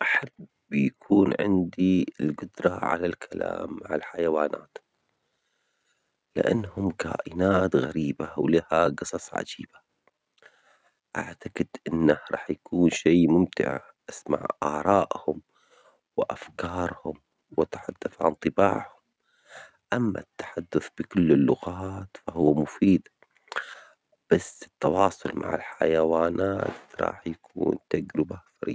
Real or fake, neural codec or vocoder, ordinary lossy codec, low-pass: real; none; none; none